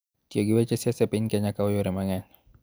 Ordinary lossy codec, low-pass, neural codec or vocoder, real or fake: none; none; none; real